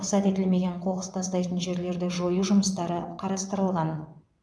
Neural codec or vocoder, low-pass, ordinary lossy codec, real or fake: vocoder, 22.05 kHz, 80 mel bands, WaveNeXt; none; none; fake